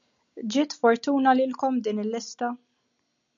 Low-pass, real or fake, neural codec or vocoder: 7.2 kHz; real; none